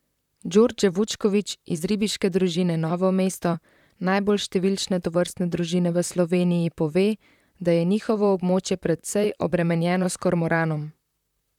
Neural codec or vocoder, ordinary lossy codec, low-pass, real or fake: vocoder, 44.1 kHz, 128 mel bands, Pupu-Vocoder; none; 19.8 kHz; fake